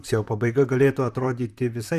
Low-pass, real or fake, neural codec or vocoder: 14.4 kHz; fake; vocoder, 44.1 kHz, 128 mel bands, Pupu-Vocoder